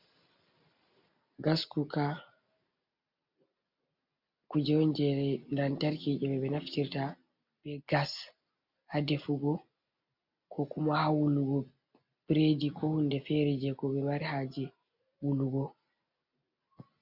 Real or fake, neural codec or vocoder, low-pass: real; none; 5.4 kHz